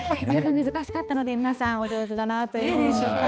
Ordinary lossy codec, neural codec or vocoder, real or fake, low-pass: none; codec, 16 kHz, 2 kbps, X-Codec, HuBERT features, trained on balanced general audio; fake; none